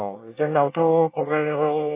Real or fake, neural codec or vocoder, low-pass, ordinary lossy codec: fake; codec, 24 kHz, 1 kbps, SNAC; 3.6 kHz; AAC, 24 kbps